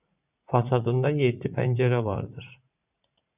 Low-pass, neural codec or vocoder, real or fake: 3.6 kHz; vocoder, 44.1 kHz, 80 mel bands, Vocos; fake